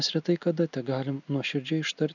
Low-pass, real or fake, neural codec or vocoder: 7.2 kHz; real; none